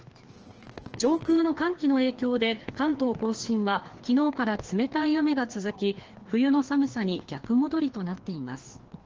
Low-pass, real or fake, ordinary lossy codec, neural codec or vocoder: 7.2 kHz; fake; Opus, 16 kbps; codec, 16 kHz, 2 kbps, FreqCodec, larger model